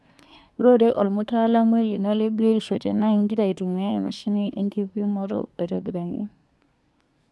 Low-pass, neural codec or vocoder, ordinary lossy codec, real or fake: none; codec, 24 kHz, 1 kbps, SNAC; none; fake